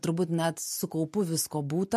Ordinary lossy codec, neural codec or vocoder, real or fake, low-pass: MP3, 64 kbps; none; real; 14.4 kHz